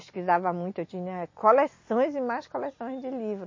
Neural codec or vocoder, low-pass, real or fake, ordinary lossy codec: none; 7.2 kHz; real; MP3, 32 kbps